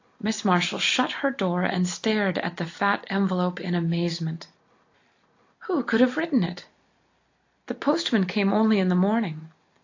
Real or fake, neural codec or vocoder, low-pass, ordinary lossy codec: real; none; 7.2 kHz; AAC, 48 kbps